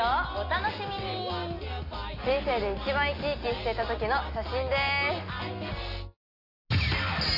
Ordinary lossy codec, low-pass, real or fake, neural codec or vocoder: AAC, 32 kbps; 5.4 kHz; real; none